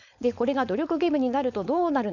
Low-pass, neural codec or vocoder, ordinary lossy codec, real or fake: 7.2 kHz; codec, 16 kHz, 4.8 kbps, FACodec; none; fake